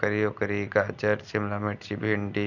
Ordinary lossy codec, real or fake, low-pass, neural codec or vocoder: none; real; 7.2 kHz; none